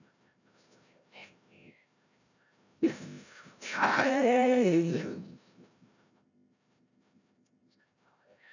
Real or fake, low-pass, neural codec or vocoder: fake; 7.2 kHz; codec, 16 kHz, 0.5 kbps, FreqCodec, larger model